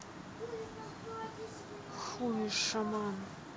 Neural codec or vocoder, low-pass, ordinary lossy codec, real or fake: none; none; none; real